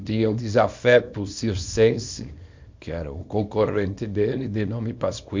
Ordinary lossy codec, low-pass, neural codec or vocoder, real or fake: MP3, 64 kbps; 7.2 kHz; codec, 24 kHz, 0.9 kbps, WavTokenizer, small release; fake